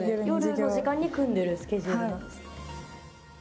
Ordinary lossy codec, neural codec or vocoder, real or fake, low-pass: none; none; real; none